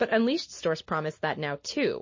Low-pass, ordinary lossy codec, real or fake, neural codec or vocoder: 7.2 kHz; MP3, 32 kbps; real; none